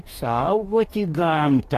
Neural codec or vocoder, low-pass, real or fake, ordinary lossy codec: codec, 44.1 kHz, 2.6 kbps, DAC; 14.4 kHz; fake; AAC, 48 kbps